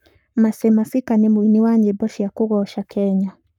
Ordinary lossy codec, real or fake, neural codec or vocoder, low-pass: none; fake; codec, 44.1 kHz, 7.8 kbps, Pupu-Codec; 19.8 kHz